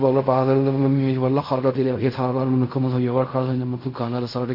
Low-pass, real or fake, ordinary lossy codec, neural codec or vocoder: 5.4 kHz; fake; MP3, 32 kbps; codec, 16 kHz in and 24 kHz out, 0.4 kbps, LongCat-Audio-Codec, fine tuned four codebook decoder